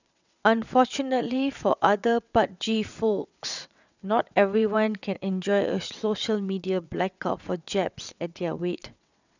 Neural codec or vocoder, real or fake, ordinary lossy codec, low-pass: vocoder, 22.05 kHz, 80 mel bands, WaveNeXt; fake; none; 7.2 kHz